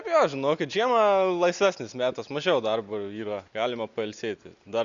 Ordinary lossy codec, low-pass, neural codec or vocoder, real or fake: Opus, 64 kbps; 7.2 kHz; none; real